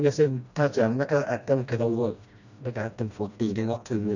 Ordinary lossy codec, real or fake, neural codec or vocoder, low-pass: none; fake; codec, 16 kHz, 1 kbps, FreqCodec, smaller model; 7.2 kHz